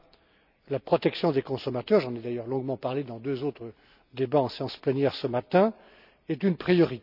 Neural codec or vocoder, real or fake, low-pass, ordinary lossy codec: none; real; 5.4 kHz; none